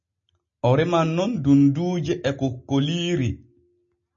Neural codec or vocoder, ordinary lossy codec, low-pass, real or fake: none; MP3, 32 kbps; 7.2 kHz; real